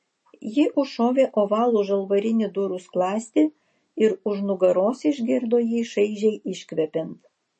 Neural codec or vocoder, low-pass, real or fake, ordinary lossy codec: none; 10.8 kHz; real; MP3, 32 kbps